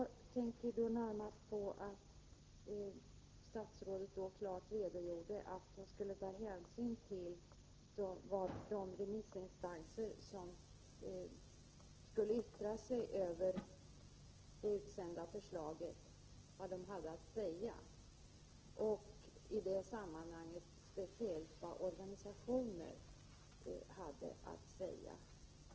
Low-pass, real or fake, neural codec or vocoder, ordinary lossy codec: 7.2 kHz; real; none; Opus, 16 kbps